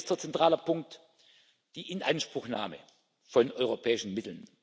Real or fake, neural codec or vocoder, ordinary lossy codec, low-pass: real; none; none; none